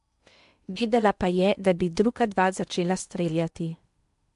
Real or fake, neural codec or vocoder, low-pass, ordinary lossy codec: fake; codec, 16 kHz in and 24 kHz out, 0.8 kbps, FocalCodec, streaming, 65536 codes; 10.8 kHz; MP3, 64 kbps